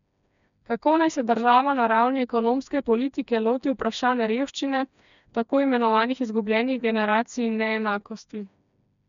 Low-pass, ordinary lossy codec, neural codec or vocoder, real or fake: 7.2 kHz; none; codec, 16 kHz, 2 kbps, FreqCodec, smaller model; fake